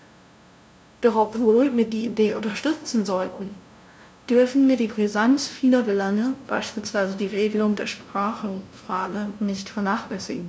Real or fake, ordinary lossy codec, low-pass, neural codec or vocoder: fake; none; none; codec, 16 kHz, 0.5 kbps, FunCodec, trained on LibriTTS, 25 frames a second